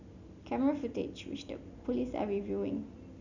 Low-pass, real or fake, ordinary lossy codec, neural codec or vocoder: 7.2 kHz; real; none; none